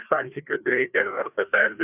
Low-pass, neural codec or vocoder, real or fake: 3.6 kHz; codec, 16 kHz, 2 kbps, FreqCodec, larger model; fake